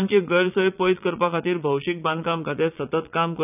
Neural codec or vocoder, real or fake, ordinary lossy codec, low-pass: vocoder, 22.05 kHz, 80 mel bands, Vocos; fake; none; 3.6 kHz